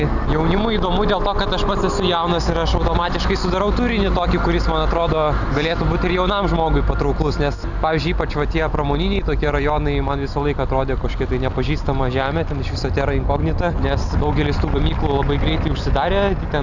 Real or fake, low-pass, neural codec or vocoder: real; 7.2 kHz; none